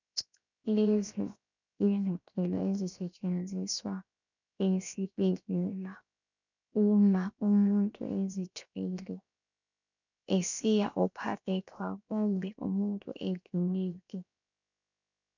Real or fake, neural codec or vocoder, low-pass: fake; codec, 16 kHz, 0.7 kbps, FocalCodec; 7.2 kHz